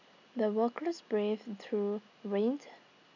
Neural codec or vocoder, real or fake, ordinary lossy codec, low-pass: none; real; none; 7.2 kHz